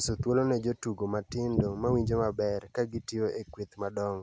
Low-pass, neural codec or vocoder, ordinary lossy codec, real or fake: none; none; none; real